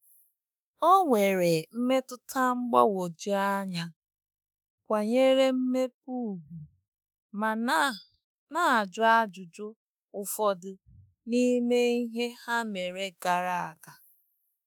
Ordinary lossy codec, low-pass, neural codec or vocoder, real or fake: none; none; autoencoder, 48 kHz, 32 numbers a frame, DAC-VAE, trained on Japanese speech; fake